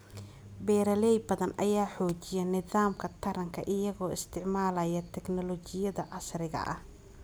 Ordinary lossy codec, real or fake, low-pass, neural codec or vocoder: none; real; none; none